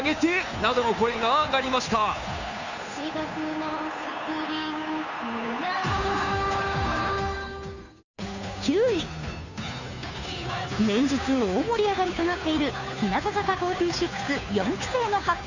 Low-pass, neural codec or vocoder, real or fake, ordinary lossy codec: 7.2 kHz; codec, 16 kHz, 2 kbps, FunCodec, trained on Chinese and English, 25 frames a second; fake; none